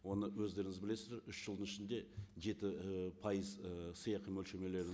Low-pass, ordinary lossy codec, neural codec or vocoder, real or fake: none; none; none; real